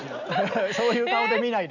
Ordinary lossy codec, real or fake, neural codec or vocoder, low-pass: none; real; none; 7.2 kHz